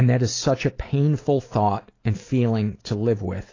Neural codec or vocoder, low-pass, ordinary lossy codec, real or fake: none; 7.2 kHz; AAC, 32 kbps; real